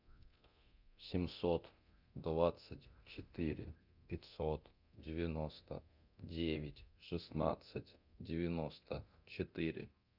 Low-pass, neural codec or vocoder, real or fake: 5.4 kHz; codec, 24 kHz, 0.9 kbps, DualCodec; fake